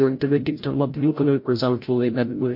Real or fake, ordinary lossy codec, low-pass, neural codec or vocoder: fake; MP3, 32 kbps; 5.4 kHz; codec, 16 kHz, 0.5 kbps, FreqCodec, larger model